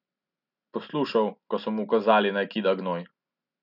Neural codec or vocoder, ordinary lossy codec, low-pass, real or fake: none; none; 5.4 kHz; real